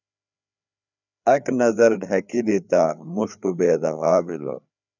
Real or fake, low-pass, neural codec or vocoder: fake; 7.2 kHz; codec, 16 kHz, 4 kbps, FreqCodec, larger model